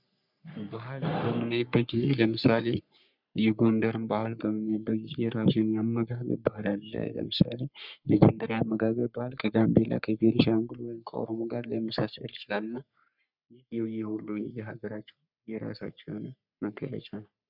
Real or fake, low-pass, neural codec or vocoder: fake; 5.4 kHz; codec, 44.1 kHz, 3.4 kbps, Pupu-Codec